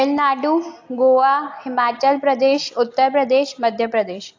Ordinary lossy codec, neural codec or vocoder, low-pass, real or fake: AAC, 48 kbps; none; 7.2 kHz; real